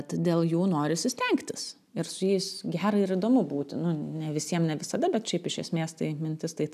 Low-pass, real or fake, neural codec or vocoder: 14.4 kHz; real; none